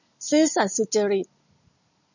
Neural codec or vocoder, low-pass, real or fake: none; 7.2 kHz; real